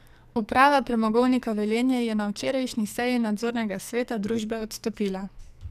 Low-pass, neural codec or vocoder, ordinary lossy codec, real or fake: 14.4 kHz; codec, 44.1 kHz, 2.6 kbps, SNAC; none; fake